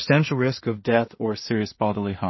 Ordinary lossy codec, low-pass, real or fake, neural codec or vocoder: MP3, 24 kbps; 7.2 kHz; fake; codec, 16 kHz in and 24 kHz out, 0.4 kbps, LongCat-Audio-Codec, two codebook decoder